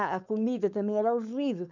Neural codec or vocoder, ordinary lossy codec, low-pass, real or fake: codec, 16 kHz, 4.8 kbps, FACodec; none; 7.2 kHz; fake